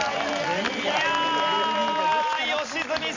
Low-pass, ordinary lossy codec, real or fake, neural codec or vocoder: 7.2 kHz; MP3, 64 kbps; real; none